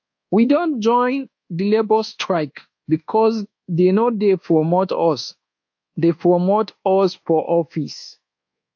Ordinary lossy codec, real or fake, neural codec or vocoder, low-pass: AAC, 48 kbps; fake; codec, 24 kHz, 1.2 kbps, DualCodec; 7.2 kHz